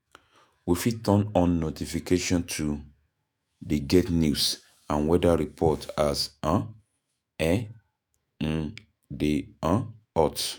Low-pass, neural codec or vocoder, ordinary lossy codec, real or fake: none; autoencoder, 48 kHz, 128 numbers a frame, DAC-VAE, trained on Japanese speech; none; fake